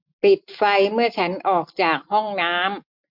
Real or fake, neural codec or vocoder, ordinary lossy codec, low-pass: real; none; MP3, 48 kbps; 5.4 kHz